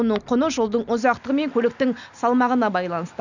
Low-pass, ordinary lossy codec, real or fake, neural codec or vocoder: 7.2 kHz; none; real; none